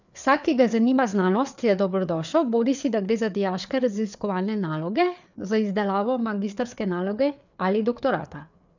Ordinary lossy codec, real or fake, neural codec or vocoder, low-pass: none; fake; codec, 16 kHz, 4 kbps, FreqCodec, larger model; 7.2 kHz